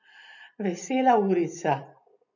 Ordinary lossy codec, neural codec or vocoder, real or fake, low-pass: none; none; real; 7.2 kHz